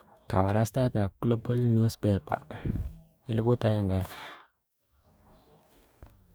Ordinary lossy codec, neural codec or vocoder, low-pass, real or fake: none; codec, 44.1 kHz, 2.6 kbps, DAC; none; fake